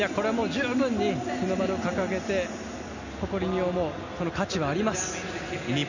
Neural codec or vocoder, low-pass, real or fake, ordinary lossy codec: none; 7.2 kHz; real; none